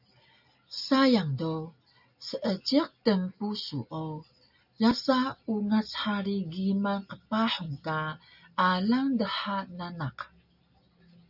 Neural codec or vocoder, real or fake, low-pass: none; real; 5.4 kHz